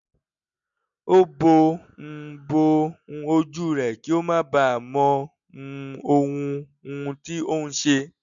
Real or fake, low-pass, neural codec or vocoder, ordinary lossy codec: real; 7.2 kHz; none; none